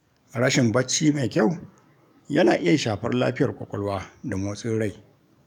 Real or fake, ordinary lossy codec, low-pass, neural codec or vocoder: fake; none; 19.8 kHz; codec, 44.1 kHz, 7.8 kbps, DAC